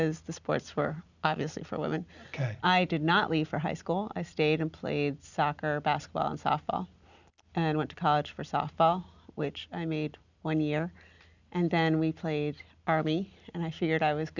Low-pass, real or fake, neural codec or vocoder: 7.2 kHz; real; none